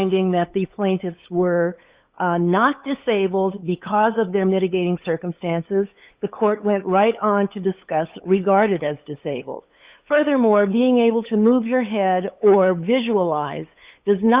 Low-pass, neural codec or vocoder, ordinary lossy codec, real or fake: 3.6 kHz; codec, 16 kHz, 8 kbps, FunCodec, trained on LibriTTS, 25 frames a second; Opus, 64 kbps; fake